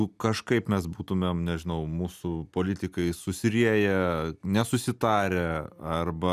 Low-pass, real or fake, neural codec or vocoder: 14.4 kHz; real; none